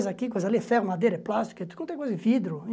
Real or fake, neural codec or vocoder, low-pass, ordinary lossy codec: real; none; none; none